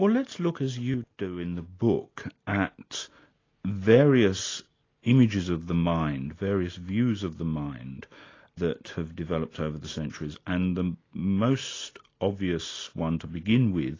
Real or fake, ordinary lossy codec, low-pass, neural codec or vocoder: real; AAC, 32 kbps; 7.2 kHz; none